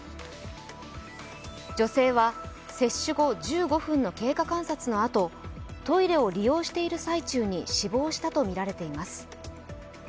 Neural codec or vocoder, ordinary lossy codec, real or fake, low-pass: none; none; real; none